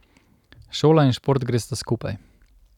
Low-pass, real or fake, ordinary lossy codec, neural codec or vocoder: 19.8 kHz; real; none; none